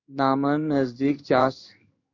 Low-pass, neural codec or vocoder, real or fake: 7.2 kHz; codec, 16 kHz in and 24 kHz out, 1 kbps, XY-Tokenizer; fake